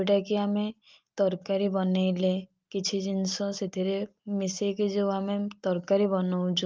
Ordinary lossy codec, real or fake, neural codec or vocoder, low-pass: Opus, 24 kbps; real; none; 7.2 kHz